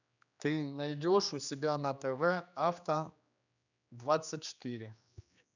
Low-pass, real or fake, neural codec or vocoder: 7.2 kHz; fake; codec, 16 kHz, 2 kbps, X-Codec, HuBERT features, trained on general audio